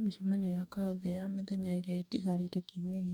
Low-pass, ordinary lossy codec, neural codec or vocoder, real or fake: none; none; codec, 44.1 kHz, 2.6 kbps, DAC; fake